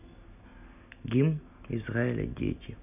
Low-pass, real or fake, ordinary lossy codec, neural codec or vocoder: 3.6 kHz; real; none; none